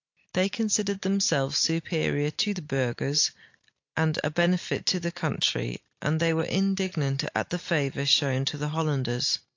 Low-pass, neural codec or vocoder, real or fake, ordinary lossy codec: 7.2 kHz; none; real; AAC, 48 kbps